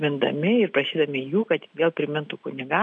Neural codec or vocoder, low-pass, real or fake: none; 9.9 kHz; real